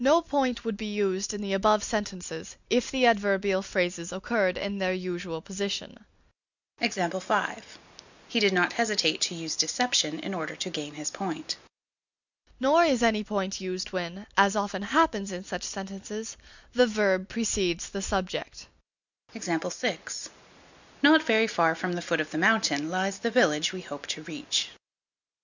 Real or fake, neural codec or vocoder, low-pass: real; none; 7.2 kHz